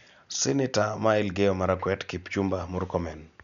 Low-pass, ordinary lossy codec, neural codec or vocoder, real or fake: 7.2 kHz; none; none; real